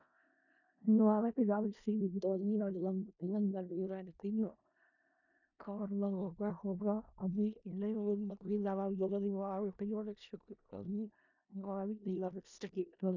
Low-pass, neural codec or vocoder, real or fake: 7.2 kHz; codec, 16 kHz in and 24 kHz out, 0.4 kbps, LongCat-Audio-Codec, four codebook decoder; fake